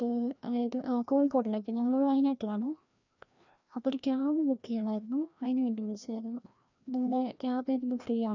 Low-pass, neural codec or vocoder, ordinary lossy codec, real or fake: 7.2 kHz; codec, 16 kHz, 1 kbps, FreqCodec, larger model; none; fake